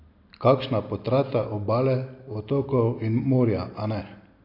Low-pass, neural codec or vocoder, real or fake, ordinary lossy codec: 5.4 kHz; none; real; AAC, 32 kbps